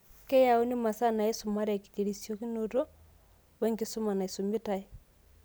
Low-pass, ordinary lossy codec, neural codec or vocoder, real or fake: none; none; none; real